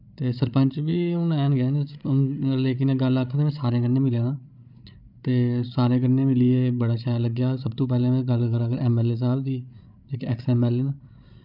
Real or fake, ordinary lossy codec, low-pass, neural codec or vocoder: fake; none; 5.4 kHz; codec, 16 kHz, 16 kbps, FreqCodec, larger model